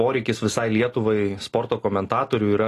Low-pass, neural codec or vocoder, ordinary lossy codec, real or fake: 14.4 kHz; none; AAC, 48 kbps; real